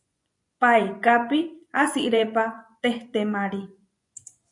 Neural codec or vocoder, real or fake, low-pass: vocoder, 24 kHz, 100 mel bands, Vocos; fake; 10.8 kHz